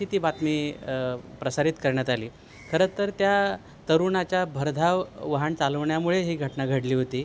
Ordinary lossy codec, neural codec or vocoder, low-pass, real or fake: none; none; none; real